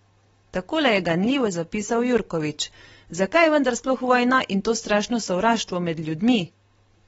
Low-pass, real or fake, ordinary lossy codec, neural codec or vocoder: 19.8 kHz; real; AAC, 24 kbps; none